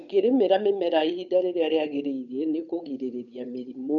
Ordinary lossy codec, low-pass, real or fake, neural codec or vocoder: none; 7.2 kHz; fake; codec, 16 kHz, 8 kbps, FunCodec, trained on Chinese and English, 25 frames a second